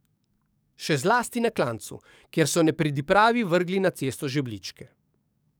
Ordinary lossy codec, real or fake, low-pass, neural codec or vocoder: none; fake; none; codec, 44.1 kHz, 7.8 kbps, DAC